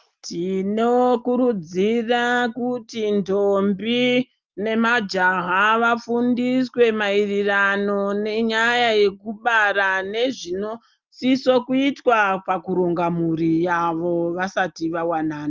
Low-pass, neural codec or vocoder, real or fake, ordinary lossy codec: 7.2 kHz; none; real; Opus, 32 kbps